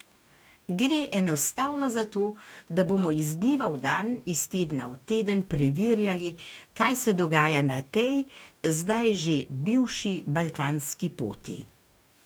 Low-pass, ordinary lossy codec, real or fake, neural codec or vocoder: none; none; fake; codec, 44.1 kHz, 2.6 kbps, DAC